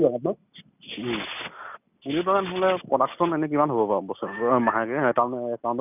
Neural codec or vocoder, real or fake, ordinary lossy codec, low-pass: none; real; none; 3.6 kHz